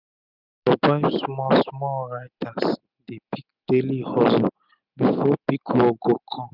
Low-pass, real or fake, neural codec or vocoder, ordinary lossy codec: 5.4 kHz; real; none; none